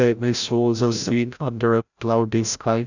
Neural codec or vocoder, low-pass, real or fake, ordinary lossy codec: codec, 16 kHz, 0.5 kbps, FreqCodec, larger model; 7.2 kHz; fake; none